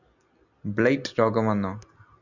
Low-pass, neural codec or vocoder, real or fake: 7.2 kHz; none; real